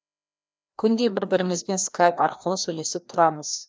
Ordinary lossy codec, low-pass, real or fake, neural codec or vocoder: none; none; fake; codec, 16 kHz, 1 kbps, FreqCodec, larger model